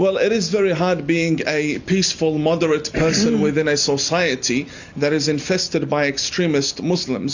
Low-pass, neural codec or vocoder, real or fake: 7.2 kHz; none; real